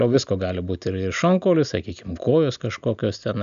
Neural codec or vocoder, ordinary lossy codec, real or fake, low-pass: none; AAC, 96 kbps; real; 7.2 kHz